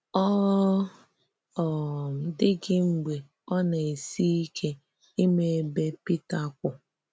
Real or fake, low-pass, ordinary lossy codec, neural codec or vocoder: real; none; none; none